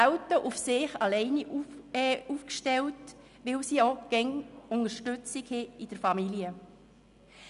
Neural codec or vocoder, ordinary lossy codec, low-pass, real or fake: none; none; 10.8 kHz; real